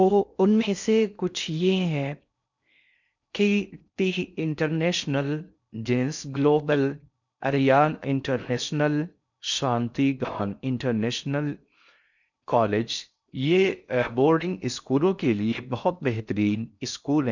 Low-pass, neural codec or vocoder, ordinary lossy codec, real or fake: 7.2 kHz; codec, 16 kHz in and 24 kHz out, 0.6 kbps, FocalCodec, streaming, 4096 codes; none; fake